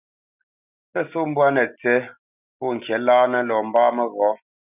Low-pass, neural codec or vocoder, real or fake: 3.6 kHz; none; real